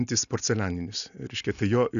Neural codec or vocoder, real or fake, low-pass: none; real; 7.2 kHz